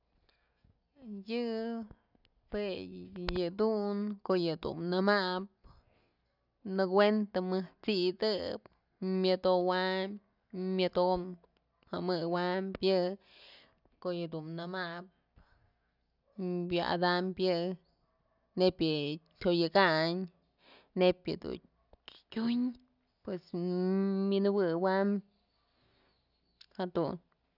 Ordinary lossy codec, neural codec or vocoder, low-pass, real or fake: none; none; 5.4 kHz; real